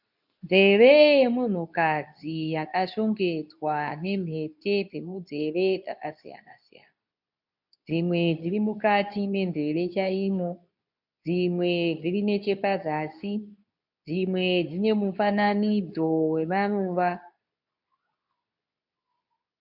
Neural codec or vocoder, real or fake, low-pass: codec, 24 kHz, 0.9 kbps, WavTokenizer, medium speech release version 2; fake; 5.4 kHz